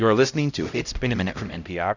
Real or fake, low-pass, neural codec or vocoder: fake; 7.2 kHz; codec, 16 kHz, 0.5 kbps, X-Codec, HuBERT features, trained on LibriSpeech